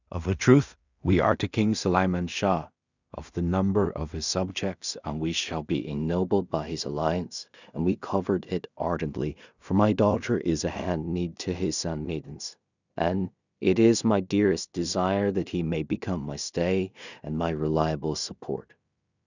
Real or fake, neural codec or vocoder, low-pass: fake; codec, 16 kHz in and 24 kHz out, 0.4 kbps, LongCat-Audio-Codec, two codebook decoder; 7.2 kHz